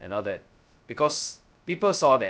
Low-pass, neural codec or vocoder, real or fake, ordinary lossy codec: none; codec, 16 kHz, 0.2 kbps, FocalCodec; fake; none